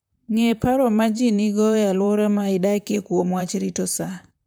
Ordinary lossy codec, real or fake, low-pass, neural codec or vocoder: none; fake; none; vocoder, 44.1 kHz, 128 mel bands, Pupu-Vocoder